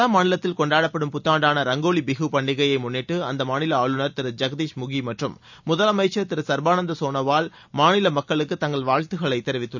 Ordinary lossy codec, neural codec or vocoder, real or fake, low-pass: none; none; real; 7.2 kHz